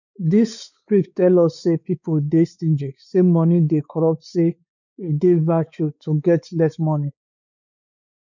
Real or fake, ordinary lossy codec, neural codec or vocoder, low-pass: fake; none; codec, 16 kHz, 4 kbps, X-Codec, WavLM features, trained on Multilingual LibriSpeech; 7.2 kHz